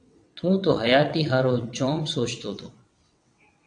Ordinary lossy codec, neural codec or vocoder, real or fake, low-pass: AAC, 64 kbps; vocoder, 22.05 kHz, 80 mel bands, WaveNeXt; fake; 9.9 kHz